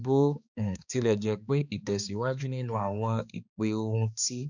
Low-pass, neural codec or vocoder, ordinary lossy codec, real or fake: 7.2 kHz; codec, 16 kHz, 2 kbps, X-Codec, HuBERT features, trained on balanced general audio; none; fake